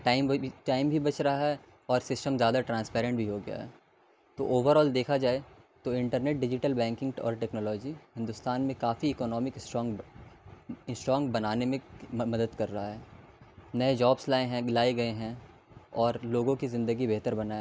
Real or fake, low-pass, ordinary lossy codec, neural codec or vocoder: real; none; none; none